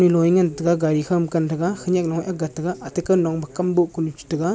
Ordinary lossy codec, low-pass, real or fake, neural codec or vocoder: none; none; real; none